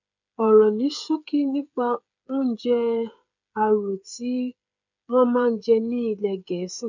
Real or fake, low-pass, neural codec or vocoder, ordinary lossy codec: fake; 7.2 kHz; codec, 16 kHz, 8 kbps, FreqCodec, smaller model; none